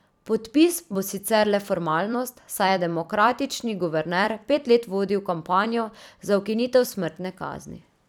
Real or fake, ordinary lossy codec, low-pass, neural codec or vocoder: fake; none; 19.8 kHz; vocoder, 44.1 kHz, 128 mel bands every 256 samples, BigVGAN v2